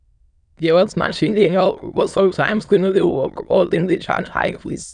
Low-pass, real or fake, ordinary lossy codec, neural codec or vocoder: 9.9 kHz; fake; none; autoencoder, 22.05 kHz, a latent of 192 numbers a frame, VITS, trained on many speakers